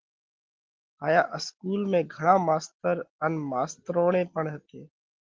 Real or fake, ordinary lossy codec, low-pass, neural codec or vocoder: real; Opus, 16 kbps; 7.2 kHz; none